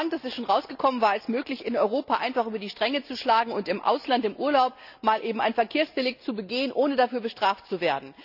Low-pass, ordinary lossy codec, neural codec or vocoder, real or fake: 5.4 kHz; none; none; real